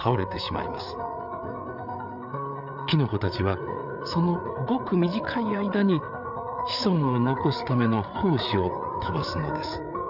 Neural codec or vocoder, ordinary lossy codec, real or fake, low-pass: codec, 16 kHz, 8 kbps, FreqCodec, larger model; none; fake; 5.4 kHz